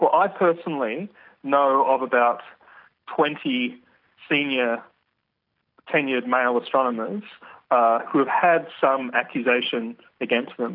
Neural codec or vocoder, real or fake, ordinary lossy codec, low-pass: none; real; AAC, 48 kbps; 5.4 kHz